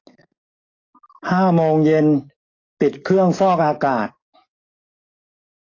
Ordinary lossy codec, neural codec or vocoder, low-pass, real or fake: AAC, 32 kbps; codec, 44.1 kHz, 7.8 kbps, DAC; 7.2 kHz; fake